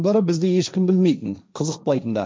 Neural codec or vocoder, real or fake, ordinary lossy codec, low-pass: codec, 16 kHz, 1.1 kbps, Voila-Tokenizer; fake; none; none